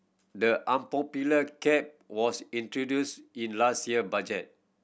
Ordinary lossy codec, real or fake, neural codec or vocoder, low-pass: none; real; none; none